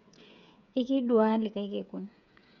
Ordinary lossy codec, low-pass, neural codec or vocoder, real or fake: none; 7.2 kHz; codec, 16 kHz, 8 kbps, FreqCodec, smaller model; fake